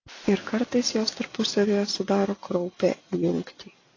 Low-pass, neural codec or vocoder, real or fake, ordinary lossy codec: 7.2 kHz; none; real; AAC, 32 kbps